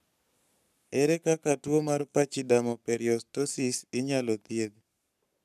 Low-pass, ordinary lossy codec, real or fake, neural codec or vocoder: 14.4 kHz; none; fake; codec, 44.1 kHz, 7.8 kbps, Pupu-Codec